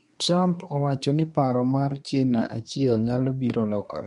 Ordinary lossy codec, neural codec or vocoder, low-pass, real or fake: MP3, 64 kbps; codec, 24 kHz, 1 kbps, SNAC; 10.8 kHz; fake